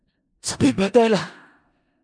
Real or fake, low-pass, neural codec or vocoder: fake; 9.9 kHz; codec, 16 kHz in and 24 kHz out, 0.4 kbps, LongCat-Audio-Codec, four codebook decoder